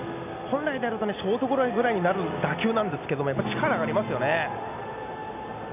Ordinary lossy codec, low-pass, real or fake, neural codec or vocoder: none; 3.6 kHz; real; none